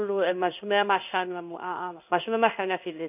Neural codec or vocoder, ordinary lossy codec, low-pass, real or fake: codec, 16 kHz in and 24 kHz out, 1 kbps, XY-Tokenizer; none; 3.6 kHz; fake